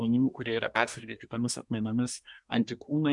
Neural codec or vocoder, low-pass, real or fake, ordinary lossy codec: codec, 24 kHz, 1 kbps, SNAC; 10.8 kHz; fake; MP3, 96 kbps